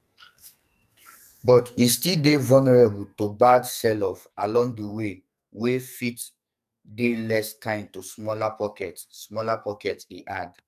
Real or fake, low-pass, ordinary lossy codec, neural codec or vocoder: fake; 14.4 kHz; none; codec, 44.1 kHz, 2.6 kbps, SNAC